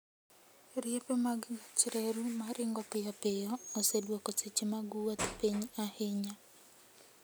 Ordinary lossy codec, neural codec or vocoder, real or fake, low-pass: none; none; real; none